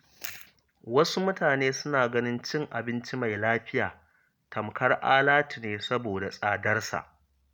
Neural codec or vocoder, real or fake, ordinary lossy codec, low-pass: none; real; none; none